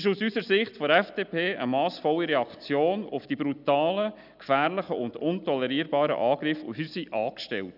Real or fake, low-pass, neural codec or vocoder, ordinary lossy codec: real; 5.4 kHz; none; none